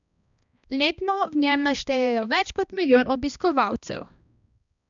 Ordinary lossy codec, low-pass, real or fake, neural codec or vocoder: none; 7.2 kHz; fake; codec, 16 kHz, 1 kbps, X-Codec, HuBERT features, trained on balanced general audio